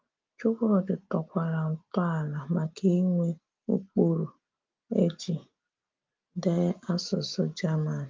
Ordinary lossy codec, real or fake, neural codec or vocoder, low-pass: Opus, 32 kbps; real; none; 7.2 kHz